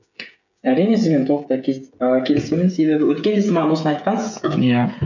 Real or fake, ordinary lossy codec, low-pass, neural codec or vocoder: fake; none; 7.2 kHz; codec, 16 kHz, 8 kbps, FreqCodec, smaller model